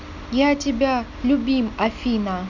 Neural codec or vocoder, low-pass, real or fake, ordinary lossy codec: none; 7.2 kHz; real; none